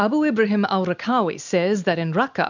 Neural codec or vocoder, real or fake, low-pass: none; real; 7.2 kHz